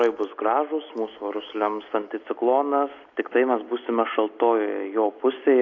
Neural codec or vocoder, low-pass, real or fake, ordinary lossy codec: none; 7.2 kHz; real; AAC, 48 kbps